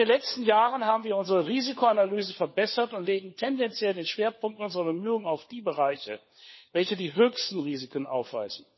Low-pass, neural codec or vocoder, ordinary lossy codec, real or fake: 7.2 kHz; codec, 16 kHz, 4 kbps, FunCodec, trained on LibriTTS, 50 frames a second; MP3, 24 kbps; fake